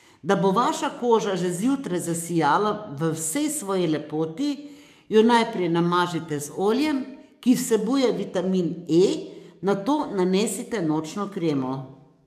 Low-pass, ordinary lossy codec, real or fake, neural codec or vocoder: 14.4 kHz; MP3, 96 kbps; fake; codec, 44.1 kHz, 7.8 kbps, DAC